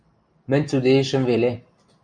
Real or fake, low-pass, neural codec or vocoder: real; 9.9 kHz; none